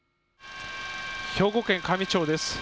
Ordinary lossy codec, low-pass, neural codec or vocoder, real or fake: none; none; none; real